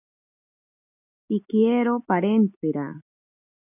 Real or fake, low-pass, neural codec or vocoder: real; 3.6 kHz; none